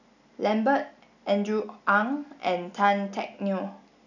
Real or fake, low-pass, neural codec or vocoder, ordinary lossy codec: real; 7.2 kHz; none; none